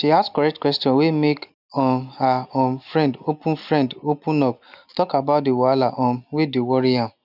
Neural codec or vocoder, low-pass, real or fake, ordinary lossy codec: none; 5.4 kHz; real; none